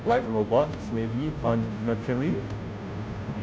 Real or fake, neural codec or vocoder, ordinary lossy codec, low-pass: fake; codec, 16 kHz, 0.5 kbps, FunCodec, trained on Chinese and English, 25 frames a second; none; none